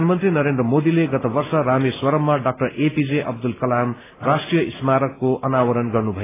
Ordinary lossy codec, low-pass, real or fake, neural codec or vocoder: AAC, 16 kbps; 3.6 kHz; real; none